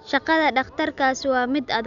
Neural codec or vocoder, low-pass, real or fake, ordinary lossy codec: none; 7.2 kHz; real; none